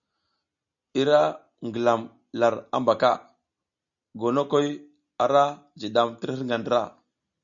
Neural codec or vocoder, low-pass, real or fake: none; 7.2 kHz; real